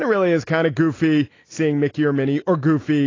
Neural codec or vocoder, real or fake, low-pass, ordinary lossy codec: none; real; 7.2 kHz; AAC, 32 kbps